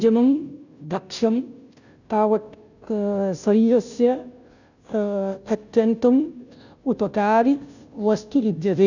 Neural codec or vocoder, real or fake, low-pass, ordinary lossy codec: codec, 16 kHz, 0.5 kbps, FunCodec, trained on Chinese and English, 25 frames a second; fake; 7.2 kHz; none